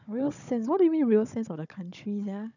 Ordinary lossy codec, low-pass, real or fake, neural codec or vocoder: none; 7.2 kHz; fake; codec, 16 kHz, 16 kbps, FunCodec, trained on Chinese and English, 50 frames a second